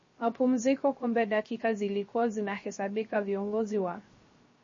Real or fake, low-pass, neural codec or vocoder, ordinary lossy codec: fake; 7.2 kHz; codec, 16 kHz, 0.3 kbps, FocalCodec; MP3, 32 kbps